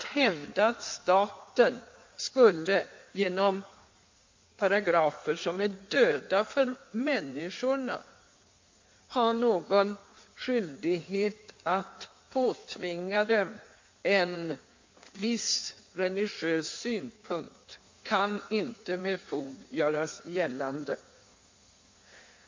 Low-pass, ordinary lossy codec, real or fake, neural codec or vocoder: 7.2 kHz; MP3, 48 kbps; fake; codec, 16 kHz in and 24 kHz out, 1.1 kbps, FireRedTTS-2 codec